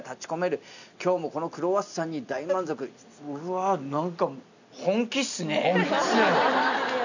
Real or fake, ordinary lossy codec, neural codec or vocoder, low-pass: real; none; none; 7.2 kHz